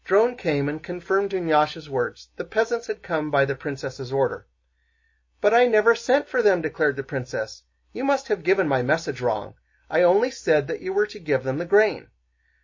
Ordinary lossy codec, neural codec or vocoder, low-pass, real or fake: MP3, 32 kbps; none; 7.2 kHz; real